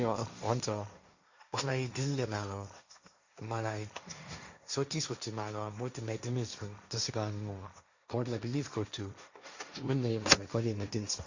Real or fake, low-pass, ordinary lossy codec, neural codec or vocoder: fake; 7.2 kHz; Opus, 64 kbps; codec, 16 kHz, 1.1 kbps, Voila-Tokenizer